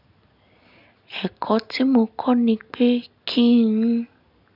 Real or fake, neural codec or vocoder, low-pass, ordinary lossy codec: real; none; 5.4 kHz; none